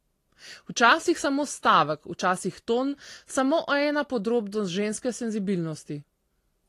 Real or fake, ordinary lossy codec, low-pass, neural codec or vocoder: real; AAC, 48 kbps; 14.4 kHz; none